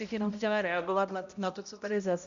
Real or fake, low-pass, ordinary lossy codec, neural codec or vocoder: fake; 7.2 kHz; MP3, 64 kbps; codec, 16 kHz, 0.5 kbps, X-Codec, HuBERT features, trained on balanced general audio